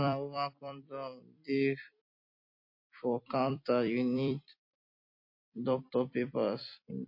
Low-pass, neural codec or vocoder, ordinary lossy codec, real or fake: 5.4 kHz; vocoder, 44.1 kHz, 128 mel bands every 256 samples, BigVGAN v2; MP3, 32 kbps; fake